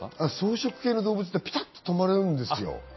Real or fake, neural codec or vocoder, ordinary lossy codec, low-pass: real; none; MP3, 24 kbps; 7.2 kHz